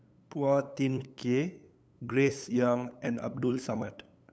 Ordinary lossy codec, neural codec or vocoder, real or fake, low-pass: none; codec, 16 kHz, 8 kbps, FunCodec, trained on LibriTTS, 25 frames a second; fake; none